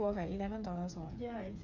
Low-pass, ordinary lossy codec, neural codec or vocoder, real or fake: 7.2 kHz; none; codec, 16 kHz, 8 kbps, FreqCodec, smaller model; fake